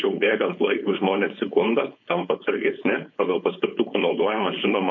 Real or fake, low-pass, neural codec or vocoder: fake; 7.2 kHz; codec, 16 kHz, 4.8 kbps, FACodec